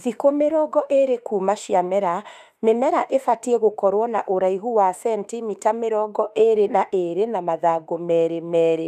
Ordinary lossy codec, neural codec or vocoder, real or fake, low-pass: none; autoencoder, 48 kHz, 32 numbers a frame, DAC-VAE, trained on Japanese speech; fake; 14.4 kHz